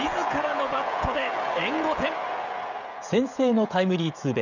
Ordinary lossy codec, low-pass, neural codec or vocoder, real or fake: none; 7.2 kHz; codec, 16 kHz, 16 kbps, FreqCodec, smaller model; fake